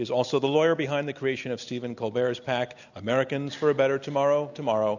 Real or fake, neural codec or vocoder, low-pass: real; none; 7.2 kHz